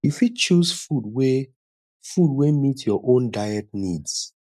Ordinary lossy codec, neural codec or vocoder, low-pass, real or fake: none; none; 14.4 kHz; real